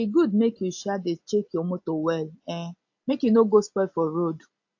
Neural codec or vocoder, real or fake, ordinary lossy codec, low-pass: none; real; AAC, 48 kbps; 7.2 kHz